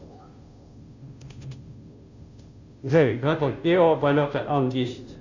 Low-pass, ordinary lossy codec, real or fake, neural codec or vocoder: 7.2 kHz; Opus, 64 kbps; fake; codec, 16 kHz, 0.5 kbps, FunCodec, trained on Chinese and English, 25 frames a second